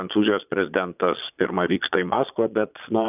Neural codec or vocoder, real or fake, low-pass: vocoder, 22.05 kHz, 80 mel bands, Vocos; fake; 3.6 kHz